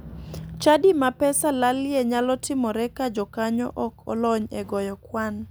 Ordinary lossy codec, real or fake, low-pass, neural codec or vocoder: none; real; none; none